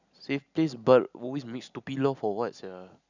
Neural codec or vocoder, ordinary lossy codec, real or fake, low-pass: none; none; real; 7.2 kHz